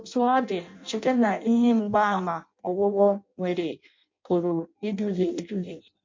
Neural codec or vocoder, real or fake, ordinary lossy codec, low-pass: codec, 16 kHz in and 24 kHz out, 0.6 kbps, FireRedTTS-2 codec; fake; MP3, 48 kbps; 7.2 kHz